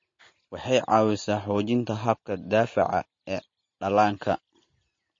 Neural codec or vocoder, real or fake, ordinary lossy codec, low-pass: none; real; MP3, 32 kbps; 7.2 kHz